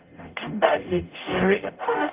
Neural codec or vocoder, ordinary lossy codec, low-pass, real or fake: codec, 44.1 kHz, 0.9 kbps, DAC; Opus, 32 kbps; 3.6 kHz; fake